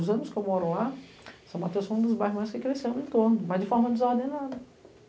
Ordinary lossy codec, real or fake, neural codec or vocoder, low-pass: none; real; none; none